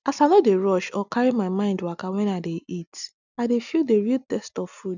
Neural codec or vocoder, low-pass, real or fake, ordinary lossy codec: none; 7.2 kHz; real; none